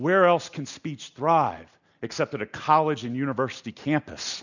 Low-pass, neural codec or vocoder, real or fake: 7.2 kHz; none; real